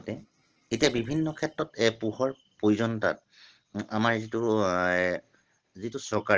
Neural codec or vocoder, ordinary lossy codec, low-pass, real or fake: none; Opus, 16 kbps; 7.2 kHz; real